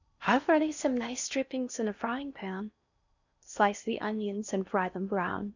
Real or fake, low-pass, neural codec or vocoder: fake; 7.2 kHz; codec, 16 kHz in and 24 kHz out, 0.6 kbps, FocalCodec, streaming, 4096 codes